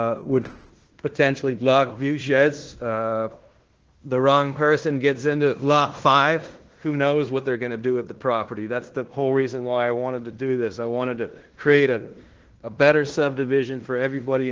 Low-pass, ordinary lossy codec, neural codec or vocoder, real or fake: 7.2 kHz; Opus, 16 kbps; codec, 16 kHz in and 24 kHz out, 0.9 kbps, LongCat-Audio-Codec, fine tuned four codebook decoder; fake